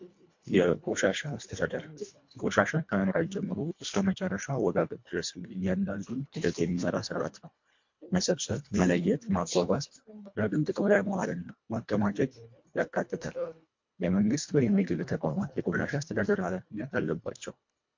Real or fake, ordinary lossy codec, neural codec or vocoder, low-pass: fake; MP3, 48 kbps; codec, 24 kHz, 1.5 kbps, HILCodec; 7.2 kHz